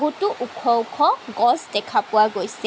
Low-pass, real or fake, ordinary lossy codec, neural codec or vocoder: none; real; none; none